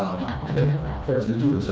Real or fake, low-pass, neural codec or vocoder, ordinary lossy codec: fake; none; codec, 16 kHz, 1 kbps, FreqCodec, smaller model; none